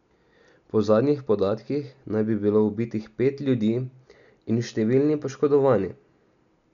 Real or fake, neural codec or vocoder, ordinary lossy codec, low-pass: real; none; none; 7.2 kHz